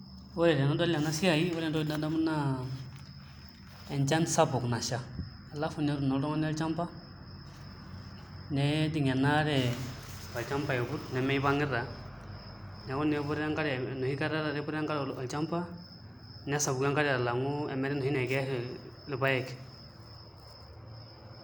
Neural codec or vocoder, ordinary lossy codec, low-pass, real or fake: none; none; none; real